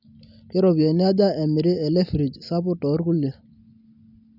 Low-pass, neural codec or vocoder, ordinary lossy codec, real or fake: 5.4 kHz; none; none; real